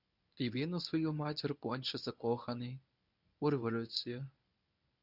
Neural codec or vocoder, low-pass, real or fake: codec, 24 kHz, 0.9 kbps, WavTokenizer, medium speech release version 1; 5.4 kHz; fake